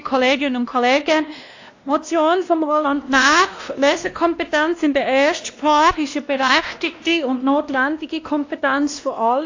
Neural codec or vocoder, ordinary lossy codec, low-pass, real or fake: codec, 16 kHz, 1 kbps, X-Codec, WavLM features, trained on Multilingual LibriSpeech; AAC, 48 kbps; 7.2 kHz; fake